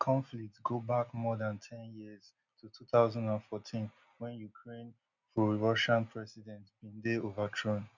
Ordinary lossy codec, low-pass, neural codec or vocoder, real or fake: none; 7.2 kHz; none; real